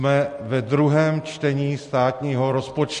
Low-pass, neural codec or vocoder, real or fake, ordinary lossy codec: 14.4 kHz; none; real; MP3, 48 kbps